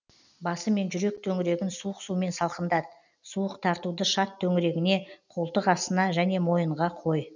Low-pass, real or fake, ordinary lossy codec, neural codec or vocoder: 7.2 kHz; real; none; none